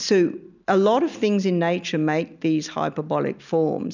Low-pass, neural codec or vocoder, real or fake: 7.2 kHz; none; real